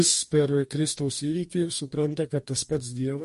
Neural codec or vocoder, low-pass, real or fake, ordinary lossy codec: codec, 44.1 kHz, 2.6 kbps, DAC; 14.4 kHz; fake; MP3, 48 kbps